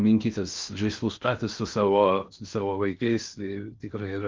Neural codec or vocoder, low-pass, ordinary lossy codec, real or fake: codec, 16 kHz in and 24 kHz out, 0.6 kbps, FocalCodec, streaming, 4096 codes; 7.2 kHz; Opus, 24 kbps; fake